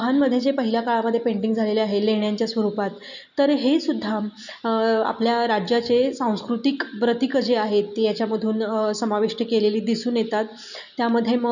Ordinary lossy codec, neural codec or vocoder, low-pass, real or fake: none; none; 7.2 kHz; real